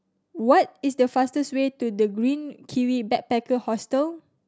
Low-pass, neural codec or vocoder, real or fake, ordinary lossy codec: none; none; real; none